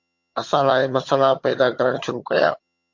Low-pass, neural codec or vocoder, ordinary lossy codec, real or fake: 7.2 kHz; vocoder, 22.05 kHz, 80 mel bands, HiFi-GAN; MP3, 48 kbps; fake